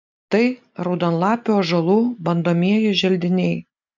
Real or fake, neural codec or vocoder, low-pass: real; none; 7.2 kHz